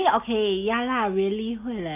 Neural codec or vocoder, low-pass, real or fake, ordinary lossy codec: none; 3.6 kHz; real; none